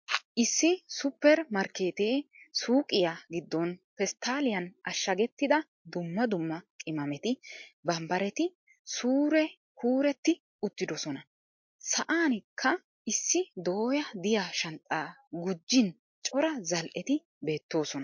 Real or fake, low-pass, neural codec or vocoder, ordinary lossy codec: real; 7.2 kHz; none; MP3, 48 kbps